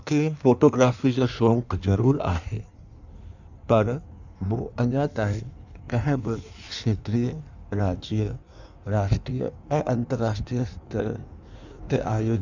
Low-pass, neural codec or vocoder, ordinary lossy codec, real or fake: 7.2 kHz; codec, 16 kHz in and 24 kHz out, 1.1 kbps, FireRedTTS-2 codec; none; fake